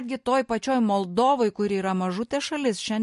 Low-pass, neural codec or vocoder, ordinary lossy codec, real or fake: 14.4 kHz; none; MP3, 48 kbps; real